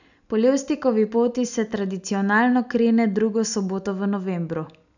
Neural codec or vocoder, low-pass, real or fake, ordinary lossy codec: none; 7.2 kHz; real; none